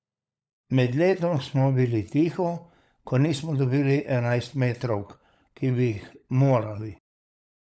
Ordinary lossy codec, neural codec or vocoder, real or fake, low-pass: none; codec, 16 kHz, 16 kbps, FunCodec, trained on LibriTTS, 50 frames a second; fake; none